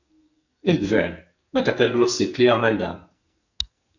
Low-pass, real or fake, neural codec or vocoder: 7.2 kHz; fake; codec, 44.1 kHz, 2.6 kbps, SNAC